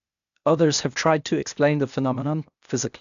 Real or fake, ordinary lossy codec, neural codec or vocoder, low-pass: fake; AAC, 96 kbps; codec, 16 kHz, 0.8 kbps, ZipCodec; 7.2 kHz